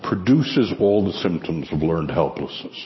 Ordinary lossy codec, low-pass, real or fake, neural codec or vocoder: MP3, 24 kbps; 7.2 kHz; real; none